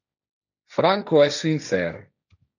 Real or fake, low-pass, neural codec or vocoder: fake; 7.2 kHz; codec, 16 kHz, 1.1 kbps, Voila-Tokenizer